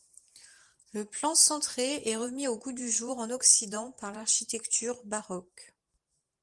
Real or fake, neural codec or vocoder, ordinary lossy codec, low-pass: fake; vocoder, 44.1 kHz, 128 mel bands every 512 samples, BigVGAN v2; Opus, 24 kbps; 10.8 kHz